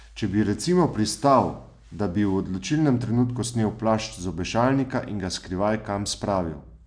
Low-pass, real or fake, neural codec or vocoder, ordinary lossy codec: 9.9 kHz; real; none; none